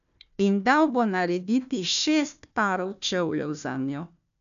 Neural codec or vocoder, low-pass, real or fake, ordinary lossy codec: codec, 16 kHz, 1 kbps, FunCodec, trained on Chinese and English, 50 frames a second; 7.2 kHz; fake; none